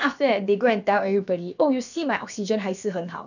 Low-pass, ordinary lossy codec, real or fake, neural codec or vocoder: 7.2 kHz; none; fake; codec, 16 kHz, about 1 kbps, DyCAST, with the encoder's durations